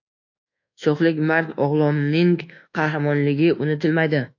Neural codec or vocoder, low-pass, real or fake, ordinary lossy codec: codec, 24 kHz, 1.2 kbps, DualCodec; 7.2 kHz; fake; AAC, 48 kbps